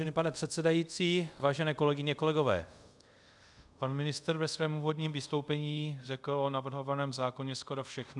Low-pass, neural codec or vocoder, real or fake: 10.8 kHz; codec, 24 kHz, 0.5 kbps, DualCodec; fake